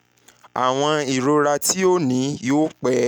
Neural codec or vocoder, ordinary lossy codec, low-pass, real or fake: none; none; 19.8 kHz; real